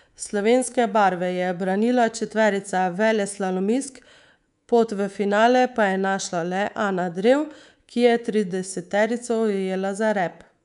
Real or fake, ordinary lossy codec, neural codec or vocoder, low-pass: fake; none; codec, 24 kHz, 3.1 kbps, DualCodec; 10.8 kHz